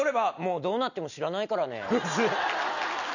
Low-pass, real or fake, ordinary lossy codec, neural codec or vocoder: 7.2 kHz; real; none; none